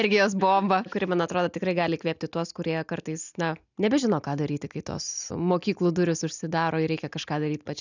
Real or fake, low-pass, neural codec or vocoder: real; 7.2 kHz; none